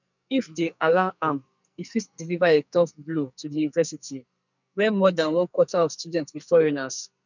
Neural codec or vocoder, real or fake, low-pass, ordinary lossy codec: codec, 44.1 kHz, 2.6 kbps, SNAC; fake; 7.2 kHz; none